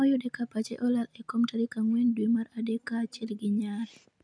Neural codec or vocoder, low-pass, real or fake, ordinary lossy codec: none; 9.9 kHz; real; none